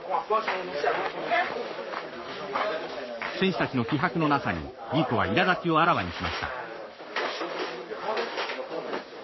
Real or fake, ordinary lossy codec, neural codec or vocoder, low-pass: fake; MP3, 24 kbps; codec, 44.1 kHz, 7.8 kbps, Pupu-Codec; 7.2 kHz